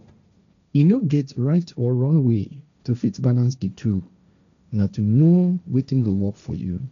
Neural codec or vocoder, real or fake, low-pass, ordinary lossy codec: codec, 16 kHz, 1.1 kbps, Voila-Tokenizer; fake; 7.2 kHz; none